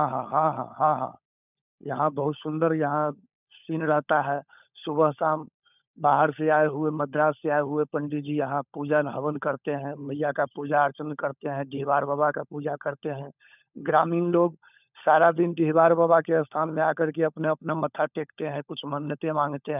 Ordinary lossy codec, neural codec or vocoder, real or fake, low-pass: none; codec, 16 kHz, 16 kbps, FunCodec, trained on LibriTTS, 50 frames a second; fake; 3.6 kHz